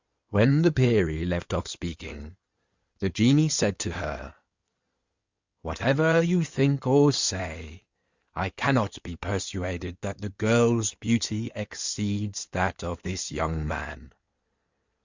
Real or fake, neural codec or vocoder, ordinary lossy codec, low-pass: fake; codec, 16 kHz in and 24 kHz out, 2.2 kbps, FireRedTTS-2 codec; Opus, 64 kbps; 7.2 kHz